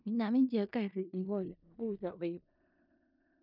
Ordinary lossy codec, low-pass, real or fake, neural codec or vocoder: none; 5.4 kHz; fake; codec, 16 kHz in and 24 kHz out, 0.4 kbps, LongCat-Audio-Codec, four codebook decoder